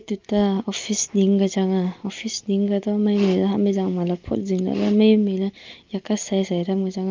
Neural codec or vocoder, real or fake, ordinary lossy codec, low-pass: none; real; Opus, 32 kbps; 7.2 kHz